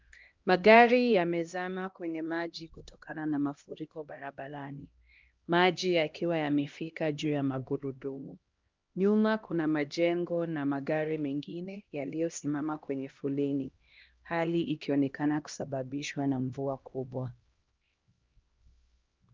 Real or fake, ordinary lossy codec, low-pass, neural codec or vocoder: fake; Opus, 24 kbps; 7.2 kHz; codec, 16 kHz, 1 kbps, X-Codec, HuBERT features, trained on LibriSpeech